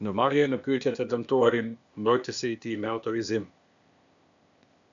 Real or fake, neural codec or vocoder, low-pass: fake; codec, 16 kHz, 0.8 kbps, ZipCodec; 7.2 kHz